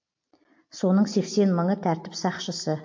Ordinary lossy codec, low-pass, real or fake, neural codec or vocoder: MP3, 48 kbps; 7.2 kHz; real; none